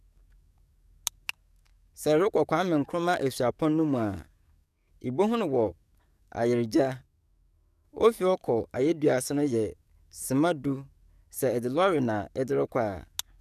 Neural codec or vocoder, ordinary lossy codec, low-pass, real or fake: codec, 44.1 kHz, 7.8 kbps, DAC; none; 14.4 kHz; fake